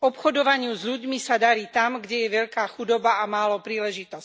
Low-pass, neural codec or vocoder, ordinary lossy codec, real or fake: none; none; none; real